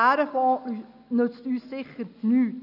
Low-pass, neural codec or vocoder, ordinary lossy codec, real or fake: 5.4 kHz; none; none; real